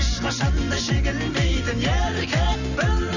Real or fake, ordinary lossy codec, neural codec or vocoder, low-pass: real; none; none; 7.2 kHz